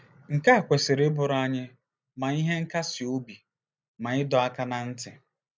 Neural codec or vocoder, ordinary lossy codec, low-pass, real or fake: none; none; none; real